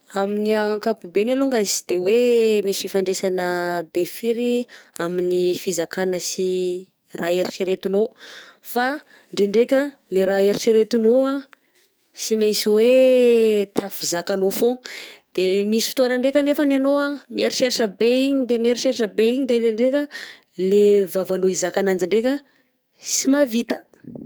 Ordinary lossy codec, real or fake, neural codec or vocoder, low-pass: none; fake; codec, 44.1 kHz, 2.6 kbps, SNAC; none